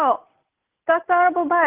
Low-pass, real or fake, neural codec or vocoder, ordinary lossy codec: 3.6 kHz; real; none; Opus, 32 kbps